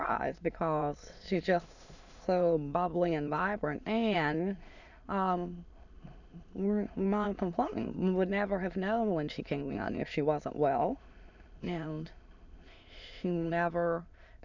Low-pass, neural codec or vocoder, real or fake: 7.2 kHz; autoencoder, 22.05 kHz, a latent of 192 numbers a frame, VITS, trained on many speakers; fake